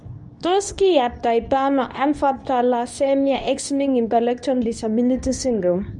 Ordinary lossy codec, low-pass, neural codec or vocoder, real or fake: none; 10.8 kHz; codec, 24 kHz, 0.9 kbps, WavTokenizer, medium speech release version 2; fake